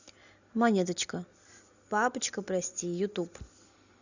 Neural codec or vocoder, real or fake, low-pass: none; real; 7.2 kHz